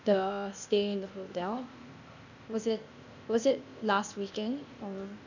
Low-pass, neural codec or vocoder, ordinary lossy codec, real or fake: 7.2 kHz; codec, 16 kHz, 0.8 kbps, ZipCodec; none; fake